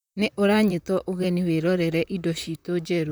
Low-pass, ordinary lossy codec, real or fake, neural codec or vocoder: none; none; fake; vocoder, 44.1 kHz, 128 mel bands, Pupu-Vocoder